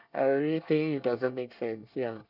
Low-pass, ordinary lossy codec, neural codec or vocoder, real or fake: 5.4 kHz; none; codec, 24 kHz, 1 kbps, SNAC; fake